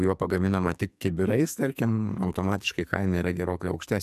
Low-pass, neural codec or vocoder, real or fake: 14.4 kHz; codec, 44.1 kHz, 2.6 kbps, SNAC; fake